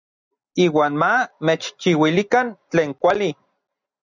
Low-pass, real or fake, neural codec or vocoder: 7.2 kHz; real; none